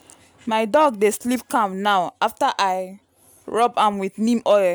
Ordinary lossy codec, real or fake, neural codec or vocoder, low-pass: none; real; none; none